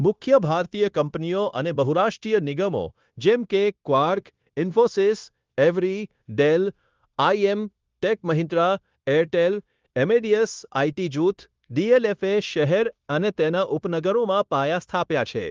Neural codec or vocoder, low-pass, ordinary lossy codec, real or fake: codec, 16 kHz, 0.9 kbps, LongCat-Audio-Codec; 7.2 kHz; Opus, 32 kbps; fake